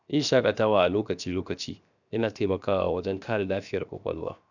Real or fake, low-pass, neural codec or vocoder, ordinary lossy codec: fake; 7.2 kHz; codec, 16 kHz, 0.7 kbps, FocalCodec; none